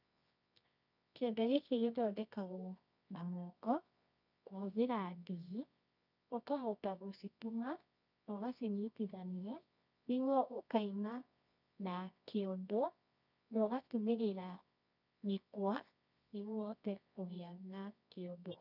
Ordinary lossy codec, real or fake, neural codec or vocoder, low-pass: none; fake; codec, 24 kHz, 0.9 kbps, WavTokenizer, medium music audio release; 5.4 kHz